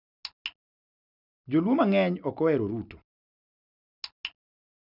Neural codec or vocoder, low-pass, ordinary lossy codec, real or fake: none; 5.4 kHz; none; real